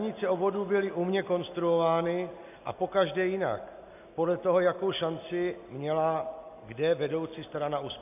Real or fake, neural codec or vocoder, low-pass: real; none; 3.6 kHz